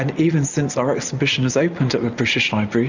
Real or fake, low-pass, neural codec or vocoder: real; 7.2 kHz; none